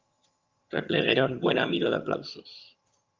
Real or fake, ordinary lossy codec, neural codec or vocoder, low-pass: fake; Opus, 32 kbps; vocoder, 22.05 kHz, 80 mel bands, HiFi-GAN; 7.2 kHz